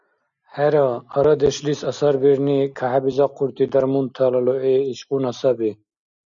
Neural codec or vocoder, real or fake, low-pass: none; real; 7.2 kHz